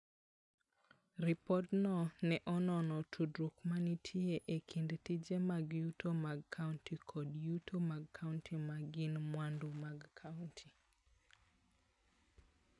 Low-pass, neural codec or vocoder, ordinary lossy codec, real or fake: 10.8 kHz; none; none; real